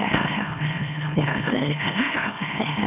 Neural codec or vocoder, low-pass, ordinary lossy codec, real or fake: autoencoder, 44.1 kHz, a latent of 192 numbers a frame, MeloTTS; 3.6 kHz; none; fake